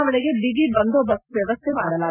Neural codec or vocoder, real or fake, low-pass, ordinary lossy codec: none; real; 3.6 kHz; none